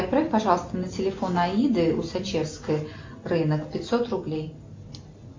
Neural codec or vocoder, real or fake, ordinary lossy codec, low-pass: none; real; MP3, 48 kbps; 7.2 kHz